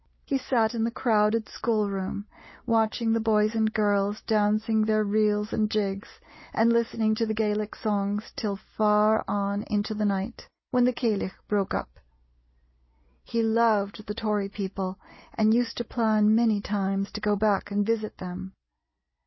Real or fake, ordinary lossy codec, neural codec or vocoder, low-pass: real; MP3, 24 kbps; none; 7.2 kHz